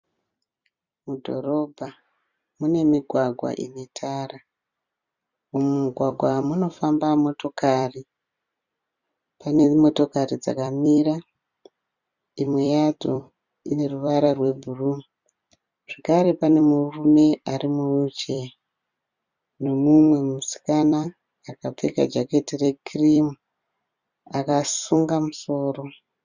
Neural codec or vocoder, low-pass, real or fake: vocoder, 44.1 kHz, 128 mel bands every 256 samples, BigVGAN v2; 7.2 kHz; fake